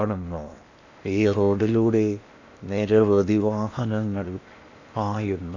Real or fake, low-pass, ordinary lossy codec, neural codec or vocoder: fake; 7.2 kHz; none; codec, 16 kHz in and 24 kHz out, 0.8 kbps, FocalCodec, streaming, 65536 codes